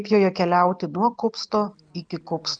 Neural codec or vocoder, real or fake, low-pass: none; real; 9.9 kHz